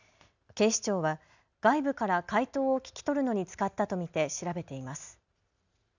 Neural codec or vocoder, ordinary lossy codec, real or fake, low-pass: none; none; real; 7.2 kHz